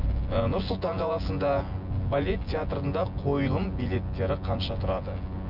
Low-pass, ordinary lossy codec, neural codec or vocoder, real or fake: 5.4 kHz; none; vocoder, 24 kHz, 100 mel bands, Vocos; fake